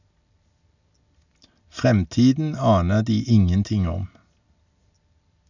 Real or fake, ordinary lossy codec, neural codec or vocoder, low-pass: real; none; none; 7.2 kHz